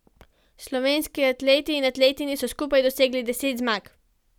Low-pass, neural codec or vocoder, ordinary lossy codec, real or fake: 19.8 kHz; none; none; real